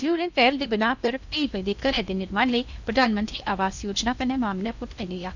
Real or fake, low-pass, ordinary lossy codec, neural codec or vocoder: fake; 7.2 kHz; none; codec, 16 kHz in and 24 kHz out, 0.8 kbps, FocalCodec, streaming, 65536 codes